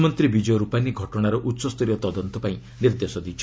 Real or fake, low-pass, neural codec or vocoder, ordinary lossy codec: real; none; none; none